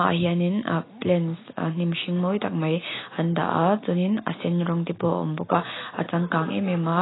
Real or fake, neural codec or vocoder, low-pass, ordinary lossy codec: real; none; 7.2 kHz; AAC, 16 kbps